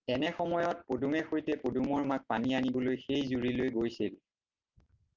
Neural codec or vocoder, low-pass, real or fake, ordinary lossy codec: none; 7.2 kHz; real; Opus, 32 kbps